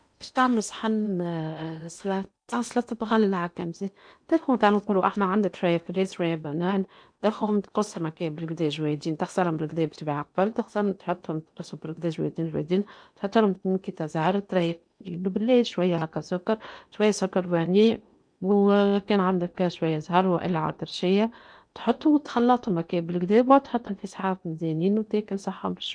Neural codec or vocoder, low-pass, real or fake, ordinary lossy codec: codec, 16 kHz in and 24 kHz out, 0.8 kbps, FocalCodec, streaming, 65536 codes; 9.9 kHz; fake; none